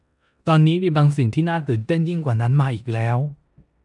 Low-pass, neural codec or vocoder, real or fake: 10.8 kHz; codec, 16 kHz in and 24 kHz out, 0.9 kbps, LongCat-Audio-Codec, four codebook decoder; fake